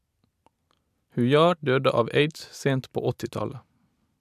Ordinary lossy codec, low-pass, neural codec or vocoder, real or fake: AAC, 96 kbps; 14.4 kHz; none; real